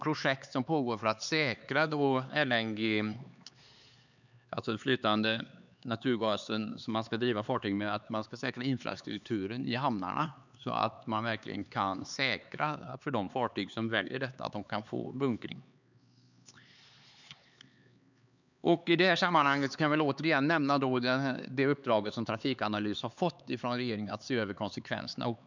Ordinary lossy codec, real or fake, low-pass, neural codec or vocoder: none; fake; 7.2 kHz; codec, 16 kHz, 4 kbps, X-Codec, HuBERT features, trained on LibriSpeech